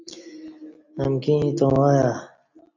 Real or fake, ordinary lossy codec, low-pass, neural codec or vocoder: real; AAC, 48 kbps; 7.2 kHz; none